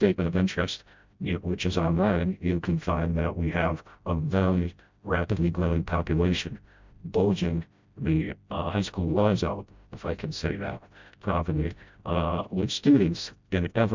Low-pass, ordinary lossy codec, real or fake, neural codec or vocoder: 7.2 kHz; MP3, 64 kbps; fake; codec, 16 kHz, 0.5 kbps, FreqCodec, smaller model